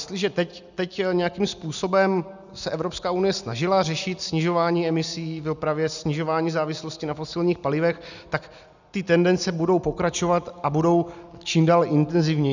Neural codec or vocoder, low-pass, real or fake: none; 7.2 kHz; real